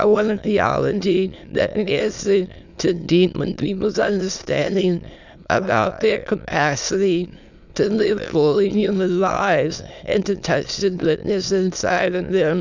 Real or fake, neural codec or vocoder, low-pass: fake; autoencoder, 22.05 kHz, a latent of 192 numbers a frame, VITS, trained on many speakers; 7.2 kHz